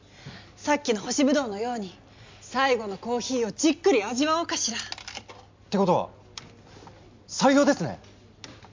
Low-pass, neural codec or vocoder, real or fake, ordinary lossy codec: 7.2 kHz; none; real; MP3, 64 kbps